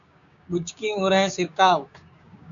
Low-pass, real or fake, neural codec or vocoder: 7.2 kHz; fake; codec, 16 kHz, 6 kbps, DAC